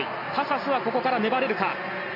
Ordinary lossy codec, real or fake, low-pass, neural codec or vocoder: none; real; 5.4 kHz; none